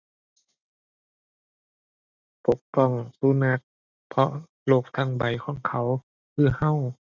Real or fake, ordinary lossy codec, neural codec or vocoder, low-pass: real; none; none; 7.2 kHz